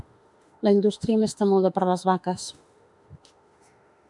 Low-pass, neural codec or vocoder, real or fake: 10.8 kHz; autoencoder, 48 kHz, 32 numbers a frame, DAC-VAE, trained on Japanese speech; fake